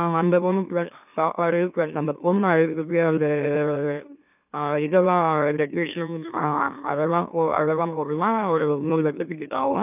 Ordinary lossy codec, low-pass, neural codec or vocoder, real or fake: none; 3.6 kHz; autoencoder, 44.1 kHz, a latent of 192 numbers a frame, MeloTTS; fake